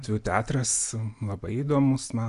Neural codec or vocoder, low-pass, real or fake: none; 10.8 kHz; real